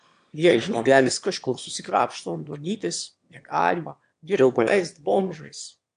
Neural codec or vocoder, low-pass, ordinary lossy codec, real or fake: autoencoder, 22.05 kHz, a latent of 192 numbers a frame, VITS, trained on one speaker; 9.9 kHz; AAC, 64 kbps; fake